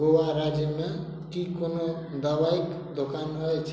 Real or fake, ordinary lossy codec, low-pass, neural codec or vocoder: real; none; none; none